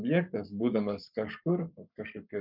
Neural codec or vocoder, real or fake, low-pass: codec, 44.1 kHz, 7.8 kbps, Pupu-Codec; fake; 5.4 kHz